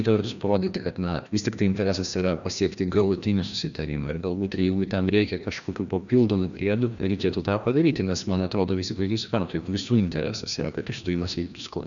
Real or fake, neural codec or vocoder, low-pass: fake; codec, 16 kHz, 1 kbps, FreqCodec, larger model; 7.2 kHz